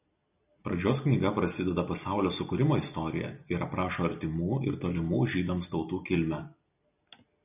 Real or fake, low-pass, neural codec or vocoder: real; 3.6 kHz; none